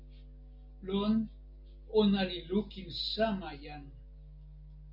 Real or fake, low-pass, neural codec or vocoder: real; 5.4 kHz; none